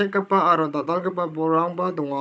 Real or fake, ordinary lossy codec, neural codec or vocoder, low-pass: fake; none; codec, 16 kHz, 16 kbps, FunCodec, trained on Chinese and English, 50 frames a second; none